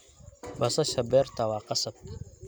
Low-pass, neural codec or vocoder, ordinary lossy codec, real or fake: none; none; none; real